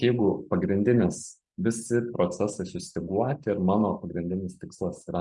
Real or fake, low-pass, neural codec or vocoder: real; 10.8 kHz; none